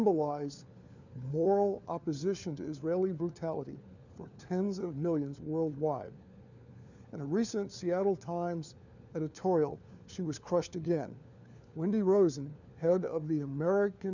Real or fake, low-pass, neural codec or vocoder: fake; 7.2 kHz; codec, 16 kHz, 4 kbps, FunCodec, trained on LibriTTS, 50 frames a second